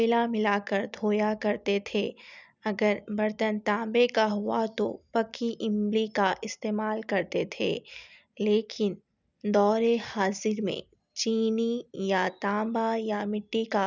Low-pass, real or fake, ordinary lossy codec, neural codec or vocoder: 7.2 kHz; real; none; none